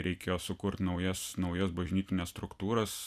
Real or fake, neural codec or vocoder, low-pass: real; none; 14.4 kHz